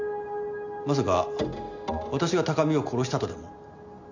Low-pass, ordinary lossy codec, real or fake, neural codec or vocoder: 7.2 kHz; none; real; none